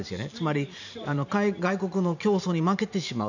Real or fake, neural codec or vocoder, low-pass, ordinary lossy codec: fake; vocoder, 44.1 kHz, 128 mel bands every 512 samples, BigVGAN v2; 7.2 kHz; none